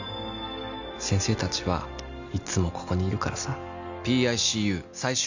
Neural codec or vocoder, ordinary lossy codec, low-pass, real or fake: none; none; 7.2 kHz; real